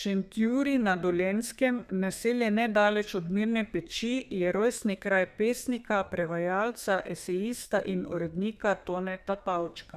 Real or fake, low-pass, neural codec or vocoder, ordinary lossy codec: fake; 14.4 kHz; codec, 32 kHz, 1.9 kbps, SNAC; none